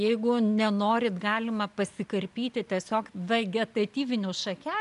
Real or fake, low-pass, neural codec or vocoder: real; 10.8 kHz; none